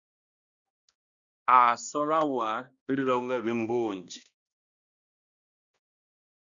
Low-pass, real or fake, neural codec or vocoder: 7.2 kHz; fake; codec, 16 kHz, 1 kbps, X-Codec, HuBERT features, trained on balanced general audio